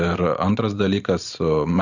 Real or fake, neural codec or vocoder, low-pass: fake; vocoder, 44.1 kHz, 128 mel bands every 512 samples, BigVGAN v2; 7.2 kHz